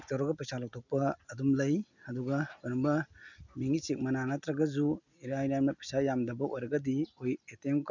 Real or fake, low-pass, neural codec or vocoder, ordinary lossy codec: real; 7.2 kHz; none; none